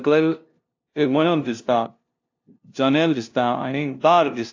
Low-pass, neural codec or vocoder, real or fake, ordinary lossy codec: 7.2 kHz; codec, 16 kHz, 0.5 kbps, FunCodec, trained on LibriTTS, 25 frames a second; fake; AAC, 48 kbps